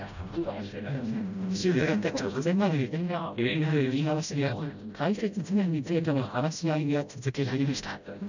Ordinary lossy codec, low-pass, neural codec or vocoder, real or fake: none; 7.2 kHz; codec, 16 kHz, 0.5 kbps, FreqCodec, smaller model; fake